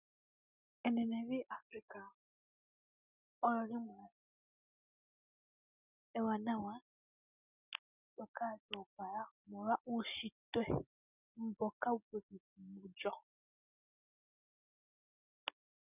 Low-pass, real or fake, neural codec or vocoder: 3.6 kHz; real; none